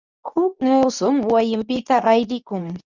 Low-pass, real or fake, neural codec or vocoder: 7.2 kHz; fake; codec, 24 kHz, 0.9 kbps, WavTokenizer, medium speech release version 2